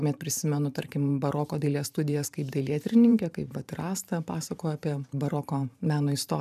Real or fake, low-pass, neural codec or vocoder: fake; 14.4 kHz; vocoder, 44.1 kHz, 128 mel bands every 512 samples, BigVGAN v2